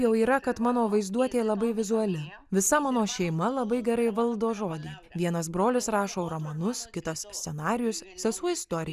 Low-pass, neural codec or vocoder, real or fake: 14.4 kHz; none; real